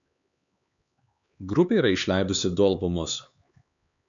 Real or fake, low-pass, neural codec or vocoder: fake; 7.2 kHz; codec, 16 kHz, 4 kbps, X-Codec, HuBERT features, trained on LibriSpeech